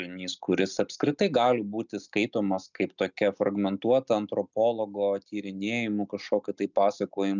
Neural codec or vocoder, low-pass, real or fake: none; 7.2 kHz; real